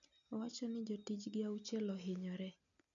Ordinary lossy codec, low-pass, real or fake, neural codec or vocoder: none; 7.2 kHz; real; none